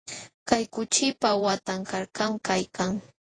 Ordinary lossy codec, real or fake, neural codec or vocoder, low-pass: AAC, 32 kbps; fake; vocoder, 48 kHz, 128 mel bands, Vocos; 9.9 kHz